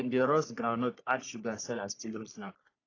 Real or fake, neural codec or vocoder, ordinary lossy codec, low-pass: fake; codec, 44.1 kHz, 3.4 kbps, Pupu-Codec; AAC, 32 kbps; 7.2 kHz